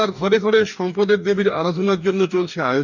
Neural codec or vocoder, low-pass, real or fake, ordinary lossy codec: codec, 44.1 kHz, 2.6 kbps, DAC; 7.2 kHz; fake; none